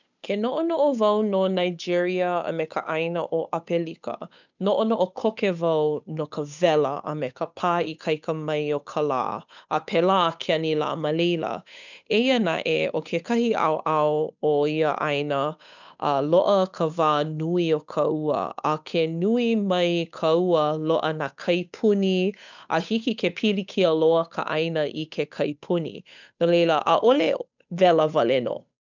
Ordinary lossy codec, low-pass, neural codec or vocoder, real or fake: none; 7.2 kHz; codec, 16 kHz, 8 kbps, FunCodec, trained on Chinese and English, 25 frames a second; fake